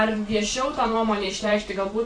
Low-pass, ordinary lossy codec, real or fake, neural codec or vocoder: 9.9 kHz; AAC, 32 kbps; fake; vocoder, 44.1 kHz, 128 mel bands every 512 samples, BigVGAN v2